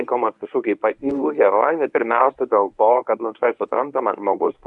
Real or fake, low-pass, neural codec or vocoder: fake; 10.8 kHz; codec, 24 kHz, 0.9 kbps, WavTokenizer, medium speech release version 1